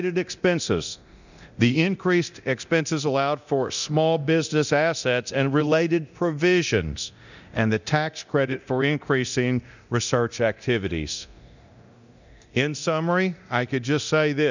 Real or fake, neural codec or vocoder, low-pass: fake; codec, 24 kHz, 0.9 kbps, DualCodec; 7.2 kHz